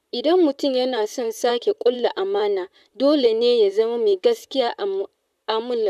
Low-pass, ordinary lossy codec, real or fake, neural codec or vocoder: 14.4 kHz; none; fake; vocoder, 44.1 kHz, 128 mel bands, Pupu-Vocoder